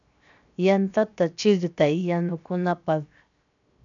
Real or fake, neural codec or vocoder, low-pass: fake; codec, 16 kHz, 0.7 kbps, FocalCodec; 7.2 kHz